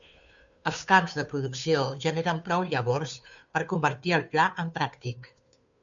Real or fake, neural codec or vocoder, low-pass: fake; codec, 16 kHz, 2 kbps, FunCodec, trained on Chinese and English, 25 frames a second; 7.2 kHz